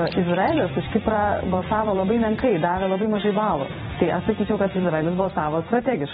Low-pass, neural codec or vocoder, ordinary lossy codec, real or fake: 19.8 kHz; vocoder, 44.1 kHz, 128 mel bands every 256 samples, BigVGAN v2; AAC, 16 kbps; fake